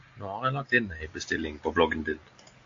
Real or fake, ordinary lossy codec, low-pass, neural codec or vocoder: real; AAC, 48 kbps; 7.2 kHz; none